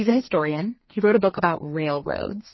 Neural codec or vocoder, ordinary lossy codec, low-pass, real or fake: codec, 32 kHz, 1.9 kbps, SNAC; MP3, 24 kbps; 7.2 kHz; fake